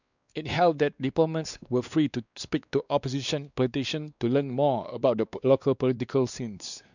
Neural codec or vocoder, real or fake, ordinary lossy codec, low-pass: codec, 16 kHz, 2 kbps, X-Codec, WavLM features, trained on Multilingual LibriSpeech; fake; none; 7.2 kHz